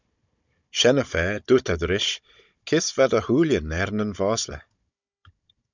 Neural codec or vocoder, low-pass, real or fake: codec, 16 kHz, 16 kbps, FunCodec, trained on Chinese and English, 50 frames a second; 7.2 kHz; fake